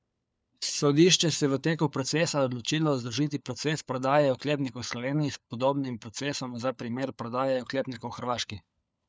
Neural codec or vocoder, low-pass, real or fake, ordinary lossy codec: codec, 16 kHz, 4 kbps, FunCodec, trained on LibriTTS, 50 frames a second; none; fake; none